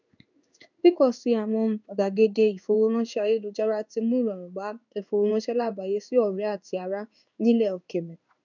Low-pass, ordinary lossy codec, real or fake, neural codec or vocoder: 7.2 kHz; none; fake; codec, 16 kHz in and 24 kHz out, 1 kbps, XY-Tokenizer